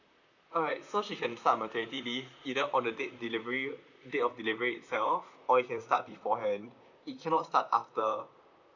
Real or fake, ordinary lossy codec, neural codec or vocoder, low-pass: fake; none; vocoder, 44.1 kHz, 128 mel bands, Pupu-Vocoder; 7.2 kHz